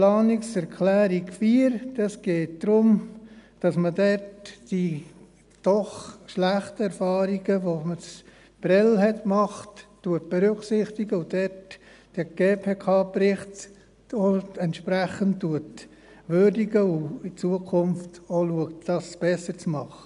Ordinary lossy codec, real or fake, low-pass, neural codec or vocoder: none; real; 10.8 kHz; none